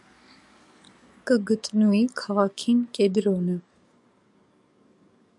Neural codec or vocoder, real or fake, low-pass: codec, 44.1 kHz, 7.8 kbps, DAC; fake; 10.8 kHz